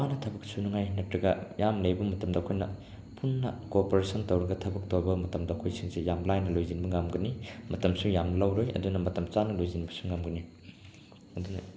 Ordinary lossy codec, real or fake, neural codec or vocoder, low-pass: none; real; none; none